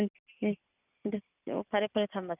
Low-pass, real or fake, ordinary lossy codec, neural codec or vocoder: 3.6 kHz; real; none; none